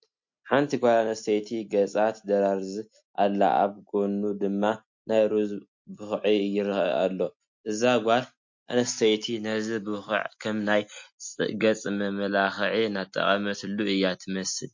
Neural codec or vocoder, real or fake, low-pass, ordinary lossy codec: none; real; 7.2 kHz; MP3, 48 kbps